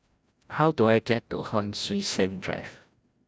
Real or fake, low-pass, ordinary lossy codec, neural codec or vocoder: fake; none; none; codec, 16 kHz, 0.5 kbps, FreqCodec, larger model